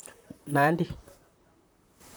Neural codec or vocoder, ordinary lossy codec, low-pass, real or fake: vocoder, 44.1 kHz, 128 mel bands, Pupu-Vocoder; none; none; fake